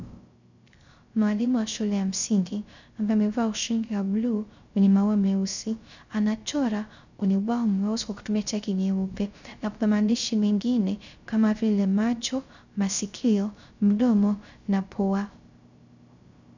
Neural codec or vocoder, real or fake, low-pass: codec, 16 kHz, 0.3 kbps, FocalCodec; fake; 7.2 kHz